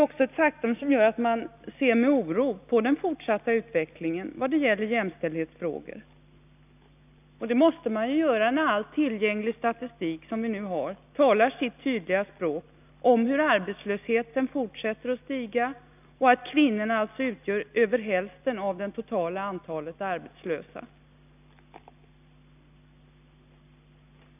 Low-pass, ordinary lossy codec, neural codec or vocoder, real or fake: 3.6 kHz; none; none; real